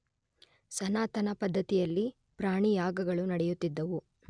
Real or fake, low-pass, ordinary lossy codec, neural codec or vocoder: real; 9.9 kHz; none; none